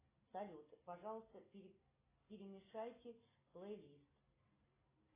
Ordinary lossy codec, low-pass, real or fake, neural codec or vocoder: AAC, 16 kbps; 3.6 kHz; real; none